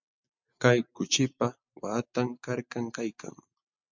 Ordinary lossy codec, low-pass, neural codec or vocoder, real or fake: MP3, 64 kbps; 7.2 kHz; none; real